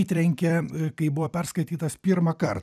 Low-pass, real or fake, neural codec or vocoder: 14.4 kHz; fake; vocoder, 44.1 kHz, 128 mel bands every 256 samples, BigVGAN v2